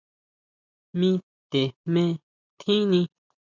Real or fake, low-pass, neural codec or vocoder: real; 7.2 kHz; none